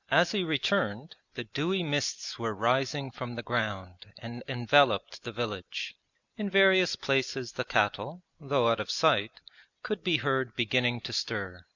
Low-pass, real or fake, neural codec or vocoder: 7.2 kHz; real; none